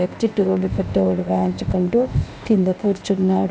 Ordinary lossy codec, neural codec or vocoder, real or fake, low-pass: none; codec, 16 kHz, 0.8 kbps, ZipCodec; fake; none